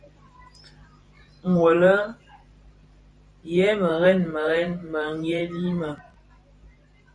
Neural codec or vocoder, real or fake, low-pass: vocoder, 44.1 kHz, 128 mel bands every 512 samples, BigVGAN v2; fake; 9.9 kHz